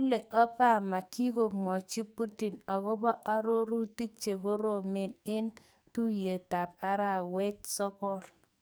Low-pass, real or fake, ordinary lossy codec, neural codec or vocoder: none; fake; none; codec, 44.1 kHz, 2.6 kbps, SNAC